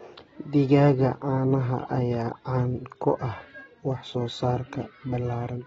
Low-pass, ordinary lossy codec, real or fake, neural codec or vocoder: 10.8 kHz; AAC, 24 kbps; real; none